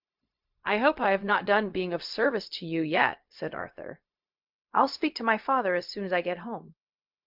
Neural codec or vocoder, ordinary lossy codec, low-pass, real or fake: codec, 16 kHz, 0.4 kbps, LongCat-Audio-Codec; MP3, 48 kbps; 5.4 kHz; fake